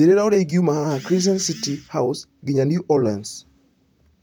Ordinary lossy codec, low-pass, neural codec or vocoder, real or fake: none; none; vocoder, 44.1 kHz, 128 mel bands, Pupu-Vocoder; fake